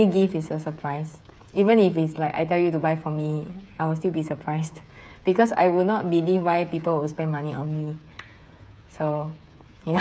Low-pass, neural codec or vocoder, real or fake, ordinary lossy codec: none; codec, 16 kHz, 8 kbps, FreqCodec, smaller model; fake; none